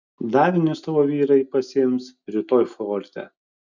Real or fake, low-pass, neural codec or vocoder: real; 7.2 kHz; none